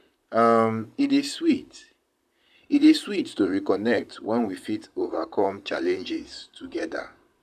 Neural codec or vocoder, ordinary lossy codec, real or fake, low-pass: vocoder, 44.1 kHz, 128 mel bands, Pupu-Vocoder; none; fake; 14.4 kHz